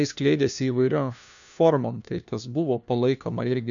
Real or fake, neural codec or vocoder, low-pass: fake; codec, 16 kHz, 1 kbps, FunCodec, trained on LibriTTS, 50 frames a second; 7.2 kHz